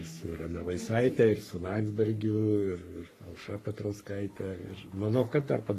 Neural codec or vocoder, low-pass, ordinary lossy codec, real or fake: codec, 44.1 kHz, 3.4 kbps, Pupu-Codec; 14.4 kHz; AAC, 48 kbps; fake